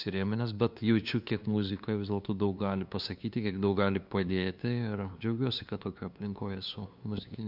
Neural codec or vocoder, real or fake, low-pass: codec, 16 kHz, 2 kbps, FunCodec, trained on LibriTTS, 25 frames a second; fake; 5.4 kHz